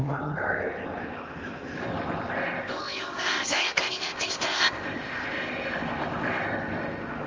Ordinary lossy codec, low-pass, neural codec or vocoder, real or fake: Opus, 32 kbps; 7.2 kHz; codec, 16 kHz in and 24 kHz out, 0.8 kbps, FocalCodec, streaming, 65536 codes; fake